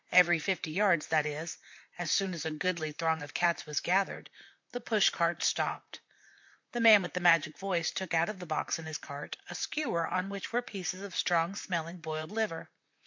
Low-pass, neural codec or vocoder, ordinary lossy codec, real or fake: 7.2 kHz; vocoder, 44.1 kHz, 128 mel bands, Pupu-Vocoder; MP3, 48 kbps; fake